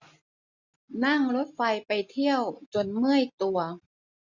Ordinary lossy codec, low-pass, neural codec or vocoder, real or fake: none; 7.2 kHz; none; real